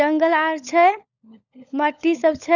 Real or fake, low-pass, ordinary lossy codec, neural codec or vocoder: fake; 7.2 kHz; none; codec, 16 kHz, 16 kbps, FunCodec, trained on LibriTTS, 50 frames a second